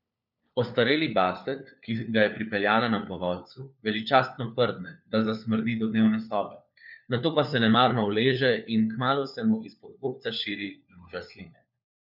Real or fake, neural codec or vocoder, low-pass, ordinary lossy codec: fake; codec, 16 kHz, 4 kbps, FunCodec, trained on LibriTTS, 50 frames a second; 5.4 kHz; none